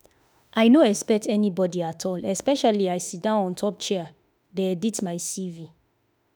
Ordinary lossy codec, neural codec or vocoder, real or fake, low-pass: none; autoencoder, 48 kHz, 32 numbers a frame, DAC-VAE, trained on Japanese speech; fake; none